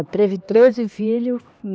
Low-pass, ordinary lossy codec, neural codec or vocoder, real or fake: none; none; codec, 16 kHz, 2 kbps, X-Codec, HuBERT features, trained on LibriSpeech; fake